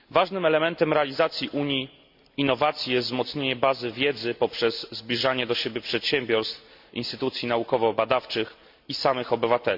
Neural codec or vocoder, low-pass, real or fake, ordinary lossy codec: none; 5.4 kHz; real; MP3, 48 kbps